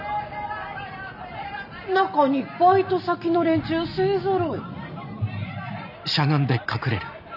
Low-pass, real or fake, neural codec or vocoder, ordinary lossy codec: 5.4 kHz; real; none; none